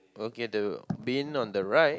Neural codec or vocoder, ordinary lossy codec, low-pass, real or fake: none; none; none; real